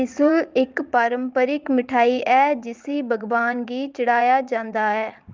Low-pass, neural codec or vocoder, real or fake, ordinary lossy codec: 7.2 kHz; vocoder, 44.1 kHz, 80 mel bands, Vocos; fake; Opus, 24 kbps